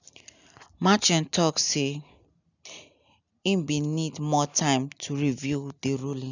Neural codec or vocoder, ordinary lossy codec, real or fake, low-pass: none; none; real; 7.2 kHz